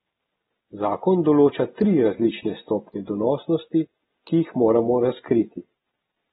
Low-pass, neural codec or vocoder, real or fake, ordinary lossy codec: 19.8 kHz; none; real; AAC, 16 kbps